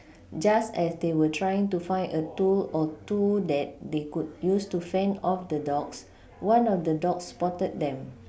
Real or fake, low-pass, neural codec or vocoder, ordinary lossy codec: real; none; none; none